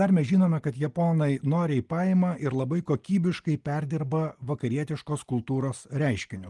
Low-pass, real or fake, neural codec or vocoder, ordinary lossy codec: 10.8 kHz; real; none; Opus, 24 kbps